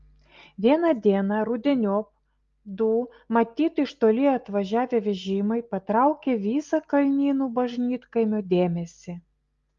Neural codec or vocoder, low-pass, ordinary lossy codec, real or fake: none; 7.2 kHz; Opus, 32 kbps; real